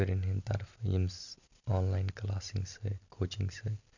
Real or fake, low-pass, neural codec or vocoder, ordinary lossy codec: real; 7.2 kHz; none; none